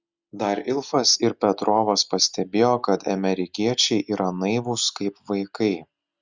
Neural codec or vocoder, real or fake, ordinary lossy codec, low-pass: none; real; Opus, 64 kbps; 7.2 kHz